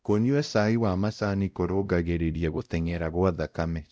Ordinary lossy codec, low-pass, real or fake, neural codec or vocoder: none; none; fake; codec, 16 kHz, 0.5 kbps, X-Codec, WavLM features, trained on Multilingual LibriSpeech